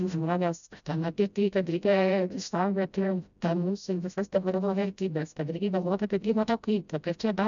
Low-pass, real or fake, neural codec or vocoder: 7.2 kHz; fake; codec, 16 kHz, 0.5 kbps, FreqCodec, smaller model